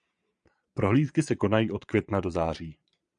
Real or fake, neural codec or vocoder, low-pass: fake; vocoder, 22.05 kHz, 80 mel bands, Vocos; 9.9 kHz